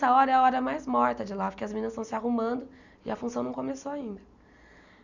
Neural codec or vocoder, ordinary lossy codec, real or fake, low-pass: none; none; real; 7.2 kHz